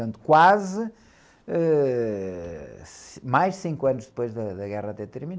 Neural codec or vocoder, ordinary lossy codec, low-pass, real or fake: none; none; none; real